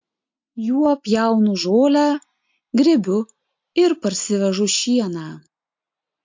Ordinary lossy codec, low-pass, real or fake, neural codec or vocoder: MP3, 48 kbps; 7.2 kHz; real; none